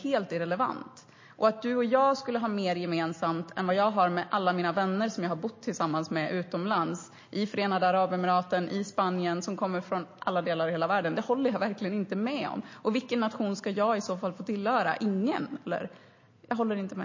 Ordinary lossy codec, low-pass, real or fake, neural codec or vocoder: MP3, 32 kbps; 7.2 kHz; real; none